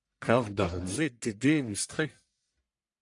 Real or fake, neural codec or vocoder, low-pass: fake; codec, 44.1 kHz, 1.7 kbps, Pupu-Codec; 10.8 kHz